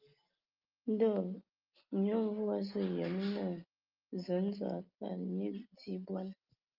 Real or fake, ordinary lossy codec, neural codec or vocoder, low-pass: real; Opus, 24 kbps; none; 5.4 kHz